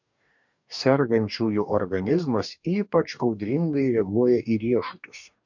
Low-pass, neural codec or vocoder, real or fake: 7.2 kHz; codec, 44.1 kHz, 2.6 kbps, DAC; fake